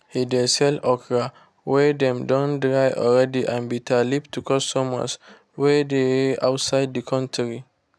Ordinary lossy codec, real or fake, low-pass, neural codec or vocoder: none; real; none; none